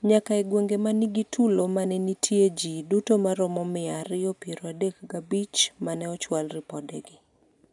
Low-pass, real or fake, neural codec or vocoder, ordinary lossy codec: 10.8 kHz; real; none; none